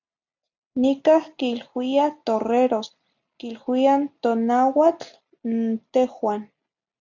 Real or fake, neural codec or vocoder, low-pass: real; none; 7.2 kHz